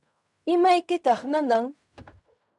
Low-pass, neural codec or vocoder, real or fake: 10.8 kHz; codec, 16 kHz in and 24 kHz out, 0.4 kbps, LongCat-Audio-Codec, fine tuned four codebook decoder; fake